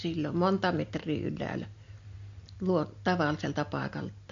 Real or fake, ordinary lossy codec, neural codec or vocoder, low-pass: real; MP3, 48 kbps; none; 7.2 kHz